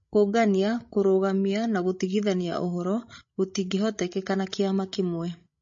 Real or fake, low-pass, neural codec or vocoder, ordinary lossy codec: fake; 7.2 kHz; codec, 16 kHz, 16 kbps, FreqCodec, larger model; MP3, 32 kbps